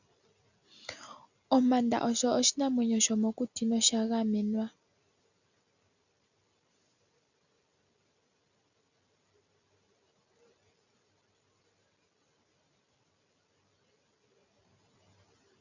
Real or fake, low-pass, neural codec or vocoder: real; 7.2 kHz; none